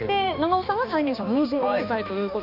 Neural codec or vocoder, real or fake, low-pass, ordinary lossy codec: codec, 16 kHz, 2 kbps, X-Codec, HuBERT features, trained on balanced general audio; fake; 5.4 kHz; none